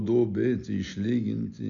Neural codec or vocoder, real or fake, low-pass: none; real; 7.2 kHz